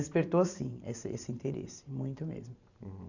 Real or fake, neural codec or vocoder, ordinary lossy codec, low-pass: fake; vocoder, 44.1 kHz, 128 mel bands every 512 samples, BigVGAN v2; none; 7.2 kHz